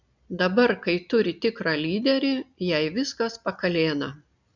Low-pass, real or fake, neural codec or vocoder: 7.2 kHz; real; none